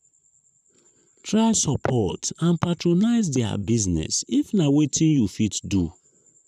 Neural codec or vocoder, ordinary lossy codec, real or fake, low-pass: vocoder, 44.1 kHz, 128 mel bands, Pupu-Vocoder; none; fake; 14.4 kHz